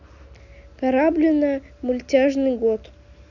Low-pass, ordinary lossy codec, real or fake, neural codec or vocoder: 7.2 kHz; none; real; none